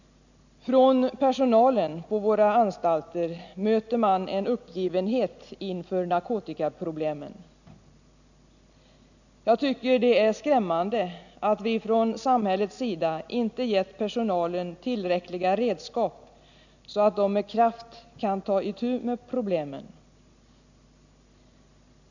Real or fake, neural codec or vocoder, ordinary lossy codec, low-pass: real; none; none; 7.2 kHz